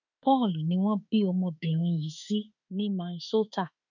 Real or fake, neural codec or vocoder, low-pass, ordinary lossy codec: fake; autoencoder, 48 kHz, 32 numbers a frame, DAC-VAE, trained on Japanese speech; 7.2 kHz; none